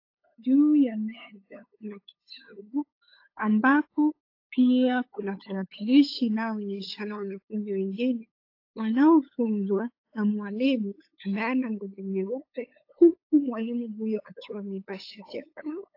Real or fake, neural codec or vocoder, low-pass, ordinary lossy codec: fake; codec, 16 kHz, 8 kbps, FunCodec, trained on LibriTTS, 25 frames a second; 5.4 kHz; AAC, 32 kbps